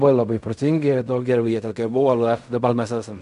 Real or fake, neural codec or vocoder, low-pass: fake; codec, 16 kHz in and 24 kHz out, 0.4 kbps, LongCat-Audio-Codec, fine tuned four codebook decoder; 10.8 kHz